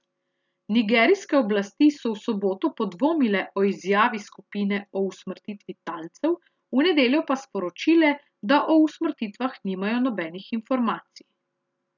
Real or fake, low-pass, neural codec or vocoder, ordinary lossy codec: real; 7.2 kHz; none; none